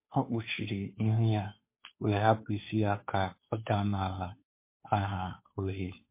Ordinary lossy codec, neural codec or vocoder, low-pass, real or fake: MP3, 24 kbps; codec, 16 kHz, 2 kbps, FunCodec, trained on Chinese and English, 25 frames a second; 3.6 kHz; fake